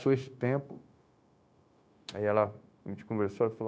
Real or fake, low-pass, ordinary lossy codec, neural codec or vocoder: fake; none; none; codec, 16 kHz, 0.9 kbps, LongCat-Audio-Codec